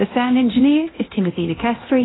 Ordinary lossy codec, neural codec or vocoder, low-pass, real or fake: AAC, 16 kbps; codec, 24 kHz, 0.9 kbps, DualCodec; 7.2 kHz; fake